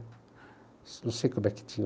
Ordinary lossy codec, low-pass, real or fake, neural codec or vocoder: none; none; real; none